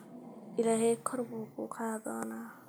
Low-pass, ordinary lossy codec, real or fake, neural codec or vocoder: none; none; real; none